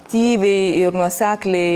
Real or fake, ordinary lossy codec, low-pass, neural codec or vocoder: fake; Opus, 24 kbps; 14.4 kHz; codec, 44.1 kHz, 7.8 kbps, DAC